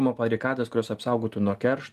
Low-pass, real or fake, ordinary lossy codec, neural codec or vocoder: 14.4 kHz; real; Opus, 32 kbps; none